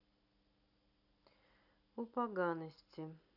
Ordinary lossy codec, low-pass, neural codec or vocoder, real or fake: none; 5.4 kHz; none; real